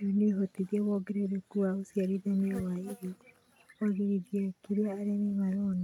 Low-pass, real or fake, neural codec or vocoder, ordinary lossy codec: 19.8 kHz; real; none; none